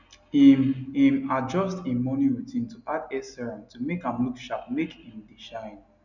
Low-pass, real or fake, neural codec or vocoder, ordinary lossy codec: 7.2 kHz; real; none; none